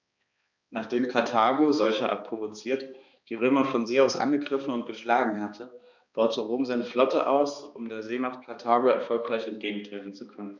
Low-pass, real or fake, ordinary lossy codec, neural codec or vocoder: 7.2 kHz; fake; none; codec, 16 kHz, 2 kbps, X-Codec, HuBERT features, trained on balanced general audio